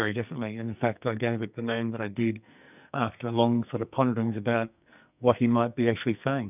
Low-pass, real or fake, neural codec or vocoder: 3.6 kHz; fake; codec, 44.1 kHz, 2.6 kbps, SNAC